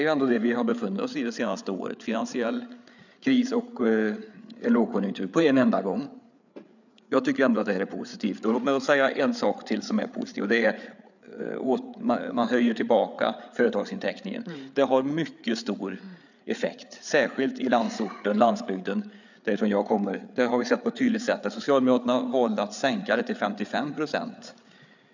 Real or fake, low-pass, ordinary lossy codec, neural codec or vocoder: fake; 7.2 kHz; none; codec, 16 kHz, 8 kbps, FreqCodec, larger model